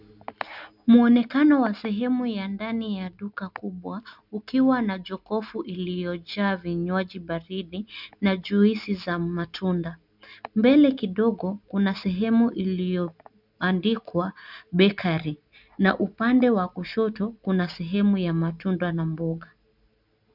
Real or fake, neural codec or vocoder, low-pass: real; none; 5.4 kHz